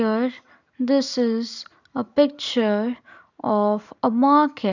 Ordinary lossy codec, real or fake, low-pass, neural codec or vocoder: none; fake; 7.2 kHz; vocoder, 44.1 kHz, 128 mel bands every 256 samples, BigVGAN v2